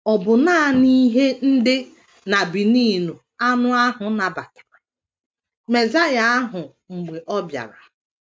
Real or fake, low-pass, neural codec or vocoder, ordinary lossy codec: real; none; none; none